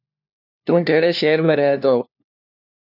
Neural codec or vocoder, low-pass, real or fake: codec, 16 kHz, 1 kbps, FunCodec, trained on LibriTTS, 50 frames a second; 5.4 kHz; fake